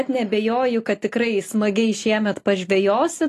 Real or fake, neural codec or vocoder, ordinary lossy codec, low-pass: real; none; AAC, 48 kbps; 14.4 kHz